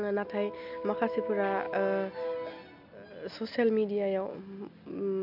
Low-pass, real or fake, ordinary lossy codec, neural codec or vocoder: 5.4 kHz; real; none; none